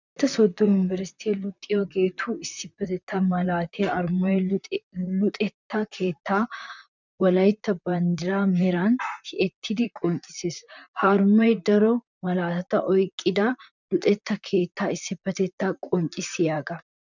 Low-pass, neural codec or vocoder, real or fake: 7.2 kHz; vocoder, 44.1 kHz, 128 mel bands, Pupu-Vocoder; fake